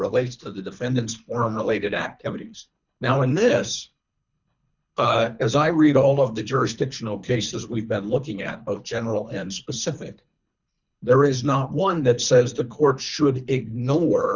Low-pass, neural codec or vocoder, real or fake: 7.2 kHz; codec, 24 kHz, 3 kbps, HILCodec; fake